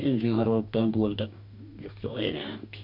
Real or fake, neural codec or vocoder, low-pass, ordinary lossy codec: fake; codec, 44.1 kHz, 2.6 kbps, DAC; 5.4 kHz; none